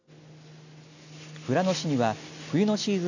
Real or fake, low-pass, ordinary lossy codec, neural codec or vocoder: real; 7.2 kHz; none; none